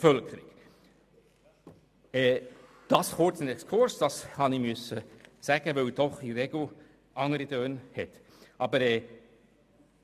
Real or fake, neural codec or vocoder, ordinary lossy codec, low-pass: real; none; MP3, 96 kbps; 14.4 kHz